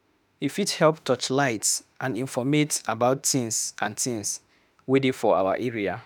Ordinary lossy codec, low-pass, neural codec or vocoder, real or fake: none; none; autoencoder, 48 kHz, 32 numbers a frame, DAC-VAE, trained on Japanese speech; fake